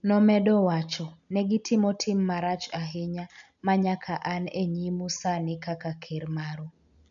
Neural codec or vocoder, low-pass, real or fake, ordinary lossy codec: none; 7.2 kHz; real; none